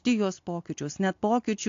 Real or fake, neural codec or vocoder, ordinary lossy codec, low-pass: real; none; AAC, 48 kbps; 7.2 kHz